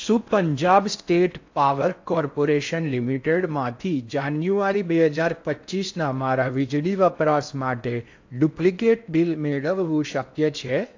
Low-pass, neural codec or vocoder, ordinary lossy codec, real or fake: 7.2 kHz; codec, 16 kHz in and 24 kHz out, 0.6 kbps, FocalCodec, streaming, 4096 codes; AAC, 48 kbps; fake